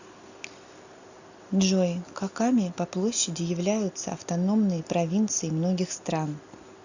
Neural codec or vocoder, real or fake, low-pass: none; real; 7.2 kHz